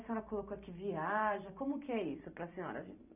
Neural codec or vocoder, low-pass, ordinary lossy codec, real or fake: vocoder, 44.1 kHz, 128 mel bands every 256 samples, BigVGAN v2; 3.6 kHz; none; fake